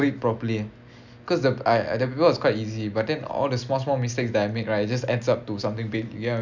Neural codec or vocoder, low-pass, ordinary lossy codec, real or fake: none; 7.2 kHz; none; real